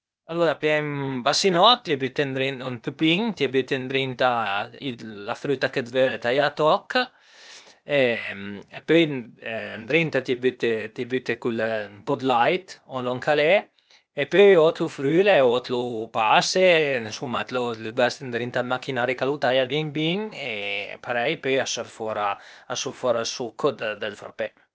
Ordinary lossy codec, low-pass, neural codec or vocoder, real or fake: none; none; codec, 16 kHz, 0.8 kbps, ZipCodec; fake